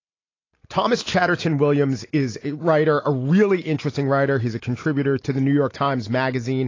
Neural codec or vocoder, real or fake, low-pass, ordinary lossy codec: none; real; 7.2 kHz; AAC, 32 kbps